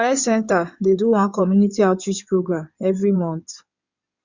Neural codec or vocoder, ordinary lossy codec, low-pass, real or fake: codec, 16 kHz in and 24 kHz out, 2.2 kbps, FireRedTTS-2 codec; Opus, 64 kbps; 7.2 kHz; fake